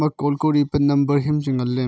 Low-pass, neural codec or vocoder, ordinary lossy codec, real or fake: none; none; none; real